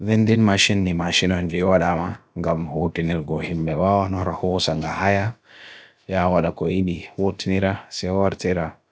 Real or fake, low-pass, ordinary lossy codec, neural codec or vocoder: fake; none; none; codec, 16 kHz, about 1 kbps, DyCAST, with the encoder's durations